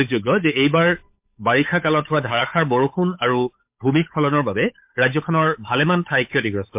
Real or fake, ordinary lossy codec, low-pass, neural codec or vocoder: fake; MP3, 32 kbps; 3.6 kHz; codec, 16 kHz, 8 kbps, FunCodec, trained on Chinese and English, 25 frames a second